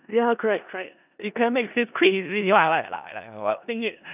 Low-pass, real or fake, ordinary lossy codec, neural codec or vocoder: 3.6 kHz; fake; none; codec, 16 kHz in and 24 kHz out, 0.4 kbps, LongCat-Audio-Codec, four codebook decoder